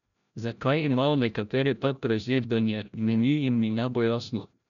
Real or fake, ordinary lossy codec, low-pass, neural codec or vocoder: fake; none; 7.2 kHz; codec, 16 kHz, 0.5 kbps, FreqCodec, larger model